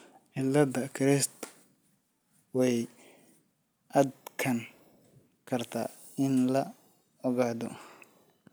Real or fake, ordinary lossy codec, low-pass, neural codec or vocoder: real; none; none; none